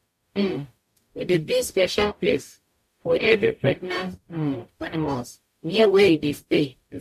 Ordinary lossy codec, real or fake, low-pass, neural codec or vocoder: MP3, 64 kbps; fake; 14.4 kHz; codec, 44.1 kHz, 0.9 kbps, DAC